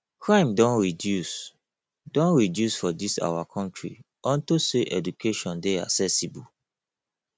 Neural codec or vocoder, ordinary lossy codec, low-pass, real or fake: none; none; none; real